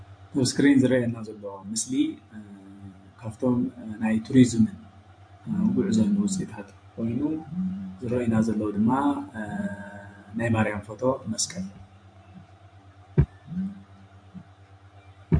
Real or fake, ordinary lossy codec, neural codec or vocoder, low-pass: fake; MP3, 48 kbps; vocoder, 44.1 kHz, 128 mel bands every 512 samples, BigVGAN v2; 9.9 kHz